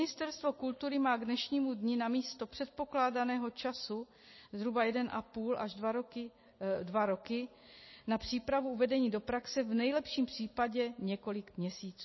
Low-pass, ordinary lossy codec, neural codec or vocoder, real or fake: 7.2 kHz; MP3, 24 kbps; none; real